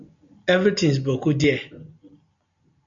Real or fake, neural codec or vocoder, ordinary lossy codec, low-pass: real; none; MP3, 96 kbps; 7.2 kHz